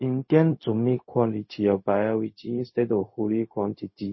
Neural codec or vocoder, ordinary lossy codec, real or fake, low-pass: codec, 16 kHz, 0.4 kbps, LongCat-Audio-Codec; MP3, 24 kbps; fake; 7.2 kHz